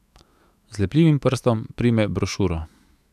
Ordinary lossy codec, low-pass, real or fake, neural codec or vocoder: none; 14.4 kHz; fake; autoencoder, 48 kHz, 128 numbers a frame, DAC-VAE, trained on Japanese speech